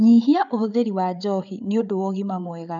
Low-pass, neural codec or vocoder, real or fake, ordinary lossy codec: 7.2 kHz; codec, 16 kHz, 8 kbps, FreqCodec, larger model; fake; none